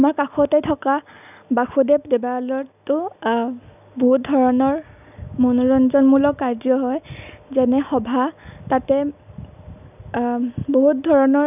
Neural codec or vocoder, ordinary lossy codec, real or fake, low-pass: none; none; real; 3.6 kHz